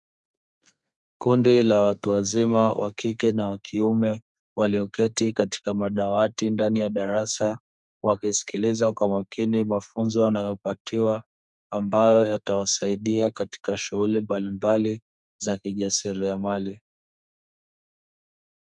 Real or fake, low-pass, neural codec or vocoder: fake; 10.8 kHz; codec, 32 kHz, 1.9 kbps, SNAC